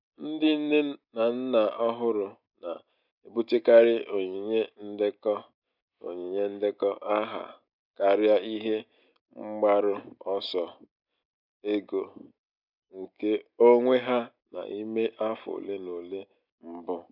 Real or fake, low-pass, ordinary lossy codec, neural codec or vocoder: real; 5.4 kHz; none; none